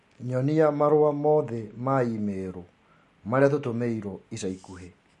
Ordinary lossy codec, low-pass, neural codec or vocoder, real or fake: MP3, 48 kbps; 14.4 kHz; none; real